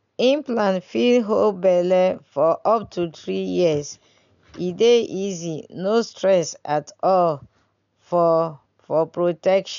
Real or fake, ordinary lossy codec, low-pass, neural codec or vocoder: real; none; 7.2 kHz; none